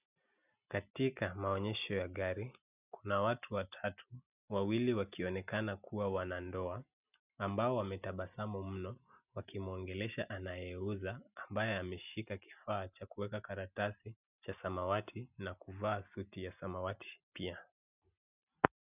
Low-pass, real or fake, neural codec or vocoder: 3.6 kHz; real; none